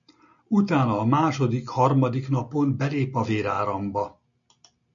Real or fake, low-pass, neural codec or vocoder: real; 7.2 kHz; none